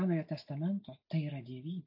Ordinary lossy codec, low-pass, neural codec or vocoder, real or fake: AAC, 48 kbps; 5.4 kHz; none; real